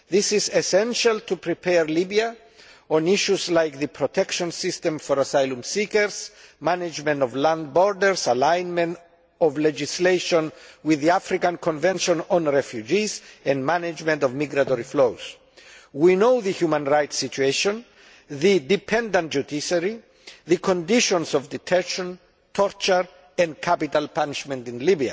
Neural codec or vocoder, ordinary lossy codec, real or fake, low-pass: none; none; real; none